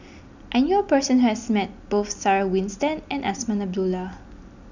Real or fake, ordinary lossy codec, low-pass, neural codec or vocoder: real; none; 7.2 kHz; none